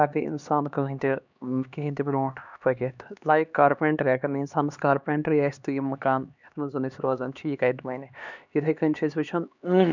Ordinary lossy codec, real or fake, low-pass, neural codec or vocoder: none; fake; 7.2 kHz; codec, 16 kHz, 2 kbps, X-Codec, HuBERT features, trained on LibriSpeech